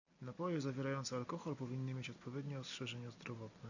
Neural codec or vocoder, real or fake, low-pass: none; real; 7.2 kHz